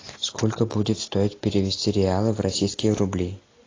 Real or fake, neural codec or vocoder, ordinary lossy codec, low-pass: real; none; AAC, 32 kbps; 7.2 kHz